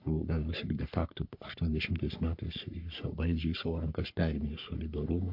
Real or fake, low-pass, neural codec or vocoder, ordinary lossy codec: fake; 5.4 kHz; codec, 44.1 kHz, 3.4 kbps, Pupu-Codec; MP3, 48 kbps